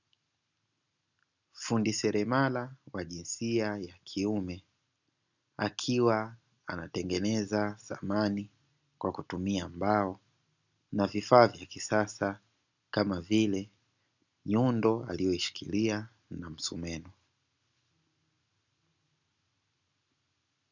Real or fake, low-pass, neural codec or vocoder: real; 7.2 kHz; none